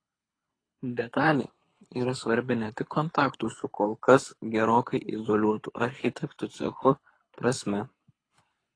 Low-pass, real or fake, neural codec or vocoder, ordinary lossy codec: 9.9 kHz; fake; codec, 24 kHz, 6 kbps, HILCodec; AAC, 32 kbps